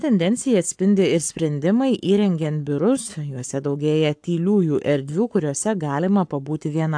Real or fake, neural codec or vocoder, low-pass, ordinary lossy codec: fake; codec, 44.1 kHz, 7.8 kbps, Pupu-Codec; 9.9 kHz; AAC, 64 kbps